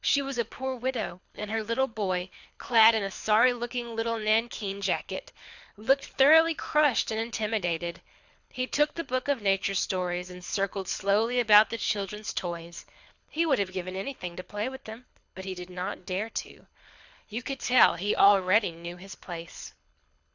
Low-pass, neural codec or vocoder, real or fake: 7.2 kHz; codec, 24 kHz, 6 kbps, HILCodec; fake